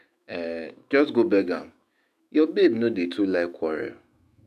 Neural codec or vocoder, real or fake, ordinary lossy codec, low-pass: autoencoder, 48 kHz, 128 numbers a frame, DAC-VAE, trained on Japanese speech; fake; none; 14.4 kHz